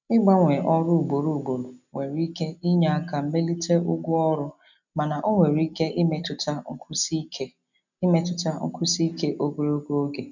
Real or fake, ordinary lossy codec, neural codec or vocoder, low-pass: real; none; none; 7.2 kHz